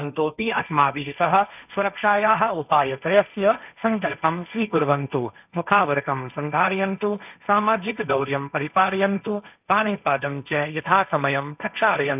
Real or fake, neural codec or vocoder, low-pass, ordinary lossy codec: fake; codec, 16 kHz, 1.1 kbps, Voila-Tokenizer; 3.6 kHz; none